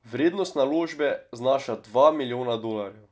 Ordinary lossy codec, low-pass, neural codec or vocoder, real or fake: none; none; none; real